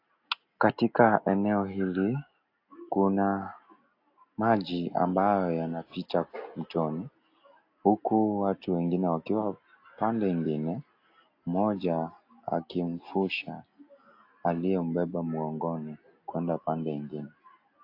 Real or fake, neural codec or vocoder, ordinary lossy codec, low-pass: real; none; AAC, 32 kbps; 5.4 kHz